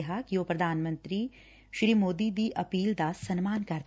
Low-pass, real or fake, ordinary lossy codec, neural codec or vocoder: none; real; none; none